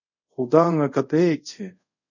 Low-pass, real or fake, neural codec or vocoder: 7.2 kHz; fake; codec, 24 kHz, 0.5 kbps, DualCodec